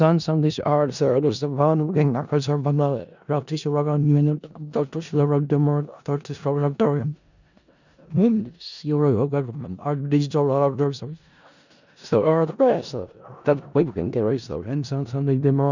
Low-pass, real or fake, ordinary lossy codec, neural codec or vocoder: 7.2 kHz; fake; none; codec, 16 kHz in and 24 kHz out, 0.4 kbps, LongCat-Audio-Codec, four codebook decoder